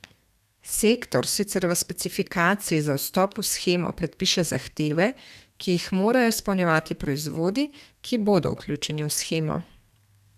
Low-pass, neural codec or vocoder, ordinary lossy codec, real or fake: 14.4 kHz; codec, 32 kHz, 1.9 kbps, SNAC; none; fake